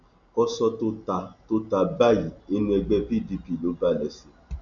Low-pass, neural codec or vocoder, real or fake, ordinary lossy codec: 7.2 kHz; none; real; none